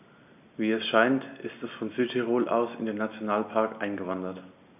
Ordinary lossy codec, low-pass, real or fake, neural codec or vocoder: none; 3.6 kHz; real; none